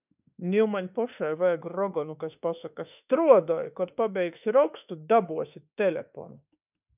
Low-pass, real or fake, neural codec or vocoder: 3.6 kHz; fake; autoencoder, 48 kHz, 32 numbers a frame, DAC-VAE, trained on Japanese speech